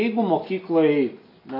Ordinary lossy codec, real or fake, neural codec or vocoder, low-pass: AAC, 32 kbps; real; none; 5.4 kHz